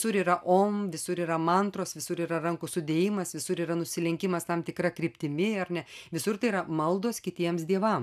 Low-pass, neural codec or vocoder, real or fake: 14.4 kHz; none; real